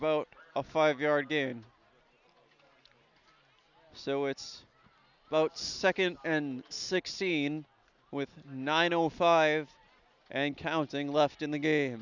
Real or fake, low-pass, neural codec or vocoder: real; 7.2 kHz; none